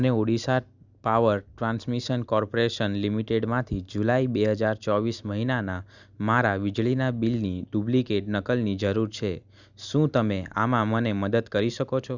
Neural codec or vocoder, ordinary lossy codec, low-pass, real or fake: none; Opus, 64 kbps; 7.2 kHz; real